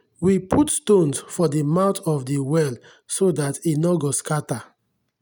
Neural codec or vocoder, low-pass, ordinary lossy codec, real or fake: none; none; none; real